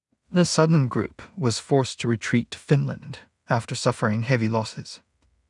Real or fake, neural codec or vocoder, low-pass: fake; codec, 16 kHz in and 24 kHz out, 0.4 kbps, LongCat-Audio-Codec, two codebook decoder; 10.8 kHz